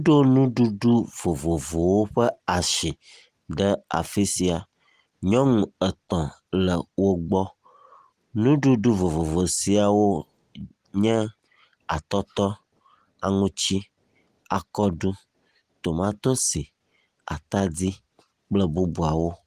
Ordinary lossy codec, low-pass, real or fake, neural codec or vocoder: Opus, 24 kbps; 14.4 kHz; real; none